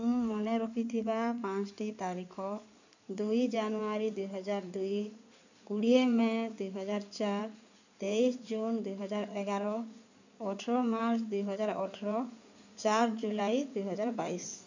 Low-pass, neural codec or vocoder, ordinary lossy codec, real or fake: 7.2 kHz; codec, 16 kHz in and 24 kHz out, 2.2 kbps, FireRedTTS-2 codec; none; fake